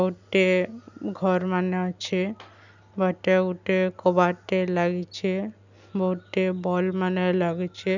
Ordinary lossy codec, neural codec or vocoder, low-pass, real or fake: none; none; 7.2 kHz; real